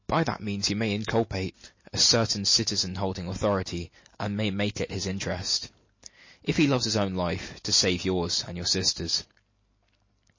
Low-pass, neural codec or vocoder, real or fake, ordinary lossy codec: 7.2 kHz; none; real; MP3, 32 kbps